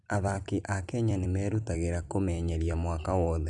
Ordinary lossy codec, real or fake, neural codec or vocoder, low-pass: none; fake; vocoder, 44.1 kHz, 128 mel bands every 256 samples, BigVGAN v2; 10.8 kHz